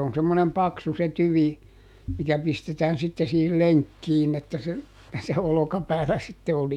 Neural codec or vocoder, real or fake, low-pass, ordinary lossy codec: autoencoder, 48 kHz, 128 numbers a frame, DAC-VAE, trained on Japanese speech; fake; 19.8 kHz; MP3, 96 kbps